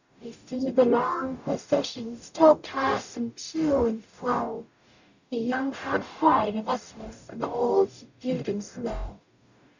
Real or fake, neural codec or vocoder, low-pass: fake; codec, 44.1 kHz, 0.9 kbps, DAC; 7.2 kHz